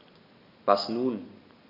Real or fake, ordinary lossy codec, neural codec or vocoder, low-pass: real; none; none; 5.4 kHz